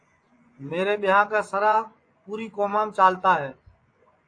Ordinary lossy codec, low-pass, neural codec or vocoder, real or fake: AAC, 48 kbps; 9.9 kHz; vocoder, 24 kHz, 100 mel bands, Vocos; fake